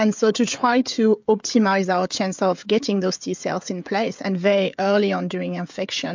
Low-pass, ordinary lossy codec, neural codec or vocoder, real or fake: 7.2 kHz; MP3, 64 kbps; codec, 16 kHz, 16 kbps, FreqCodec, smaller model; fake